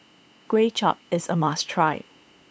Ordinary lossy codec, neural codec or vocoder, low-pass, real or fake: none; codec, 16 kHz, 8 kbps, FunCodec, trained on LibriTTS, 25 frames a second; none; fake